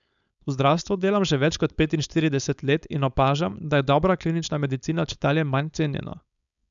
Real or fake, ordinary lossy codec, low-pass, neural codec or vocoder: fake; none; 7.2 kHz; codec, 16 kHz, 4.8 kbps, FACodec